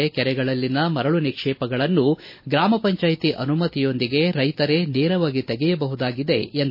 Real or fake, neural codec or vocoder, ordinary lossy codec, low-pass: real; none; MP3, 24 kbps; 5.4 kHz